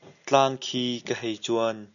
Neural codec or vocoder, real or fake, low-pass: none; real; 7.2 kHz